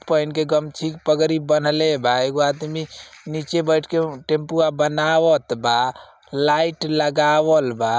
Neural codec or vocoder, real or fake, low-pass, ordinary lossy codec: none; real; none; none